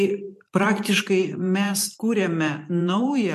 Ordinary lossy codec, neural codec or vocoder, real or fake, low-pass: MP3, 64 kbps; vocoder, 44.1 kHz, 128 mel bands every 512 samples, BigVGAN v2; fake; 14.4 kHz